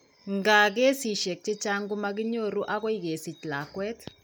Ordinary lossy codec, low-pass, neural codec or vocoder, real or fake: none; none; none; real